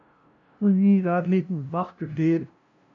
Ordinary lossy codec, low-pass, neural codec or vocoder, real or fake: AAC, 64 kbps; 7.2 kHz; codec, 16 kHz, 0.5 kbps, FunCodec, trained on LibriTTS, 25 frames a second; fake